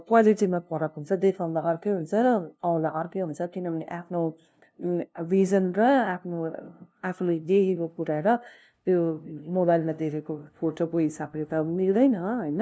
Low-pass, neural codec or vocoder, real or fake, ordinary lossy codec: none; codec, 16 kHz, 0.5 kbps, FunCodec, trained on LibriTTS, 25 frames a second; fake; none